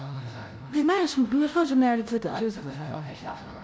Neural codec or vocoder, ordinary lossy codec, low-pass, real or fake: codec, 16 kHz, 0.5 kbps, FunCodec, trained on LibriTTS, 25 frames a second; none; none; fake